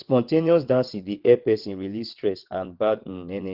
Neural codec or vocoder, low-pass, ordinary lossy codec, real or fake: vocoder, 22.05 kHz, 80 mel bands, WaveNeXt; 5.4 kHz; Opus, 16 kbps; fake